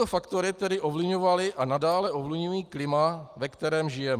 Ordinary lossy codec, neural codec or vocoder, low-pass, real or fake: Opus, 32 kbps; none; 14.4 kHz; real